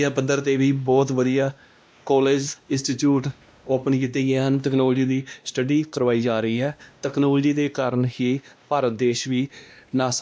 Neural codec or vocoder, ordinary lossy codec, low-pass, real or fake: codec, 16 kHz, 1 kbps, X-Codec, WavLM features, trained on Multilingual LibriSpeech; none; none; fake